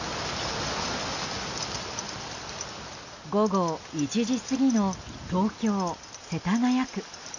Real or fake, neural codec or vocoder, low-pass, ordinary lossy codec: real; none; 7.2 kHz; none